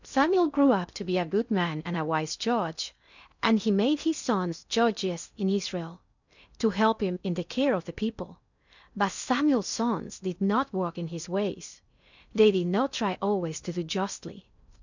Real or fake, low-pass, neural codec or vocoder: fake; 7.2 kHz; codec, 16 kHz in and 24 kHz out, 0.6 kbps, FocalCodec, streaming, 2048 codes